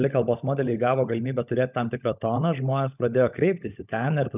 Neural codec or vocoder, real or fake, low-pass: codec, 16 kHz, 16 kbps, FunCodec, trained on LibriTTS, 50 frames a second; fake; 3.6 kHz